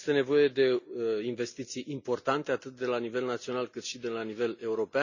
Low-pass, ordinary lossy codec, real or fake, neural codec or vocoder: 7.2 kHz; none; real; none